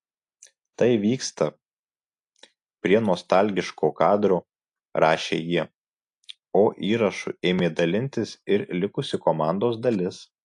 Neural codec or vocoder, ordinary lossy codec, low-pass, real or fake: none; AAC, 64 kbps; 10.8 kHz; real